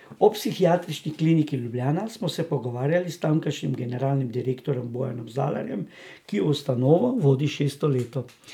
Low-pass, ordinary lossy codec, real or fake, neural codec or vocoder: 19.8 kHz; none; real; none